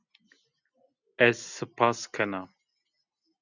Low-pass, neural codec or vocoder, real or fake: 7.2 kHz; none; real